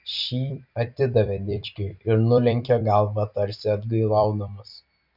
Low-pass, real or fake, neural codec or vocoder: 5.4 kHz; fake; vocoder, 44.1 kHz, 128 mel bands every 256 samples, BigVGAN v2